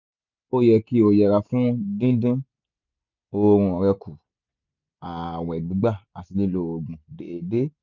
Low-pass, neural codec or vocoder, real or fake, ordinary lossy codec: 7.2 kHz; none; real; none